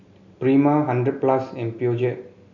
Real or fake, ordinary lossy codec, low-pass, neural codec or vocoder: real; none; 7.2 kHz; none